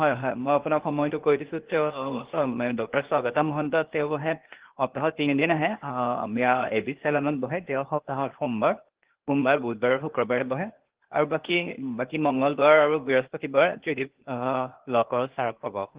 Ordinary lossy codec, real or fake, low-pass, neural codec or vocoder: Opus, 16 kbps; fake; 3.6 kHz; codec, 16 kHz, 0.8 kbps, ZipCodec